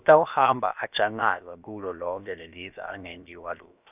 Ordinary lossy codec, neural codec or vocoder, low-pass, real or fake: none; codec, 16 kHz, about 1 kbps, DyCAST, with the encoder's durations; 3.6 kHz; fake